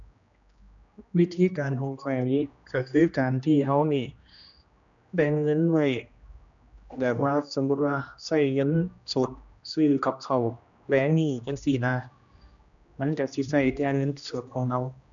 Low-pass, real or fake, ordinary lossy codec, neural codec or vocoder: 7.2 kHz; fake; none; codec, 16 kHz, 1 kbps, X-Codec, HuBERT features, trained on general audio